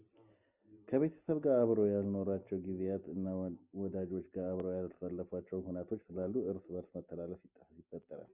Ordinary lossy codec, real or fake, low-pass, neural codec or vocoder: Opus, 64 kbps; real; 3.6 kHz; none